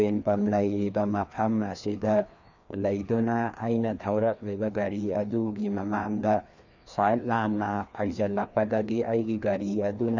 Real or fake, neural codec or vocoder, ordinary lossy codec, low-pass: fake; codec, 16 kHz, 2 kbps, FreqCodec, larger model; none; 7.2 kHz